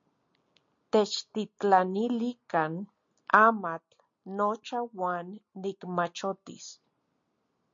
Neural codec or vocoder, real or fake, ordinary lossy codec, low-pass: none; real; MP3, 48 kbps; 7.2 kHz